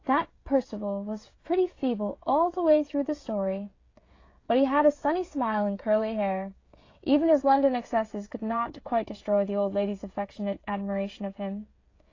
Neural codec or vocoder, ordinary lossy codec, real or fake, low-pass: codec, 16 kHz, 16 kbps, FreqCodec, smaller model; AAC, 32 kbps; fake; 7.2 kHz